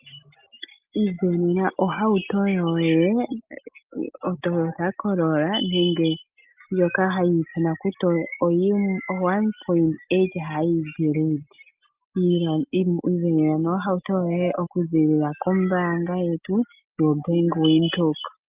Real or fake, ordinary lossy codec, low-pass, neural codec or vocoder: real; Opus, 24 kbps; 3.6 kHz; none